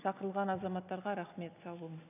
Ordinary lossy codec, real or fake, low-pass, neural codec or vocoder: none; real; 3.6 kHz; none